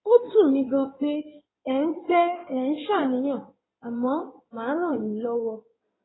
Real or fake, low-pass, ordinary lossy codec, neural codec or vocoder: fake; 7.2 kHz; AAC, 16 kbps; codec, 16 kHz in and 24 kHz out, 2.2 kbps, FireRedTTS-2 codec